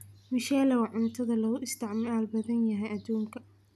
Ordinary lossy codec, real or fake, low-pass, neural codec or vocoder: none; real; 14.4 kHz; none